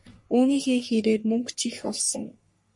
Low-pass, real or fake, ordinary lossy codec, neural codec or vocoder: 10.8 kHz; fake; MP3, 48 kbps; codec, 44.1 kHz, 3.4 kbps, Pupu-Codec